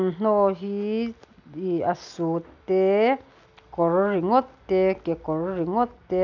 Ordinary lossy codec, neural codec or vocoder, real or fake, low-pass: none; none; real; 7.2 kHz